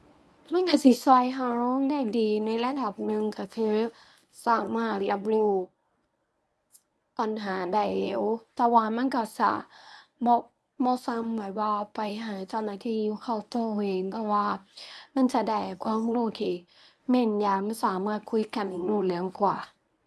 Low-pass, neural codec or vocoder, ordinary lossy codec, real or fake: none; codec, 24 kHz, 0.9 kbps, WavTokenizer, medium speech release version 1; none; fake